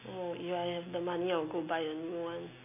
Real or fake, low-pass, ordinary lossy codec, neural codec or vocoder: real; 3.6 kHz; none; none